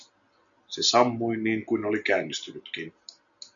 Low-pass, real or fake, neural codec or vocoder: 7.2 kHz; real; none